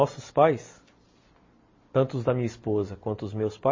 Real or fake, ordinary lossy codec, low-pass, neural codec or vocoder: real; MP3, 32 kbps; 7.2 kHz; none